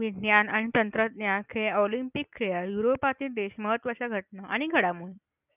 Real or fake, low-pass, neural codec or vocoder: real; 3.6 kHz; none